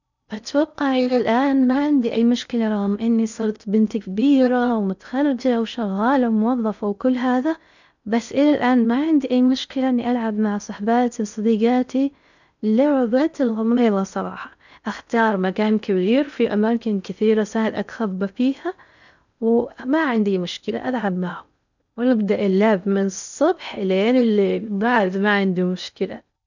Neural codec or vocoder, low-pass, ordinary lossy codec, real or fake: codec, 16 kHz in and 24 kHz out, 0.6 kbps, FocalCodec, streaming, 4096 codes; 7.2 kHz; none; fake